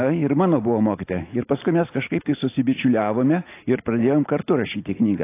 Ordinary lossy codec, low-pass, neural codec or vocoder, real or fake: AAC, 24 kbps; 3.6 kHz; none; real